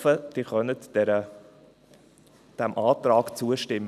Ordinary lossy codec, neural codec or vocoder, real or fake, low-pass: none; none; real; 14.4 kHz